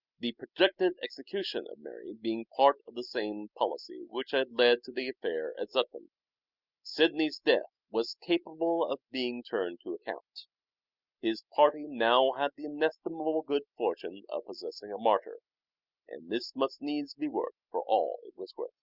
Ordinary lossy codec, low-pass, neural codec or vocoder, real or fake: Opus, 64 kbps; 5.4 kHz; none; real